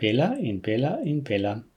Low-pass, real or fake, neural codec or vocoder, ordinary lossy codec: 19.8 kHz; real; none; none